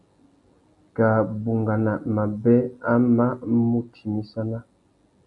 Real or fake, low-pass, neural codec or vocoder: real; 10.8 kHz; none